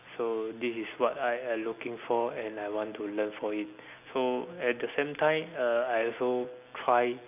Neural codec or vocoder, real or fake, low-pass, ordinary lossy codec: none; real; 3.6 kHz; none